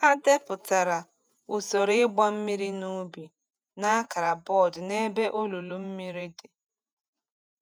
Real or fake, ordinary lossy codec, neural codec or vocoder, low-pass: fake; none; vocoder, 48 kHz, 128 mel bands, Vocos; none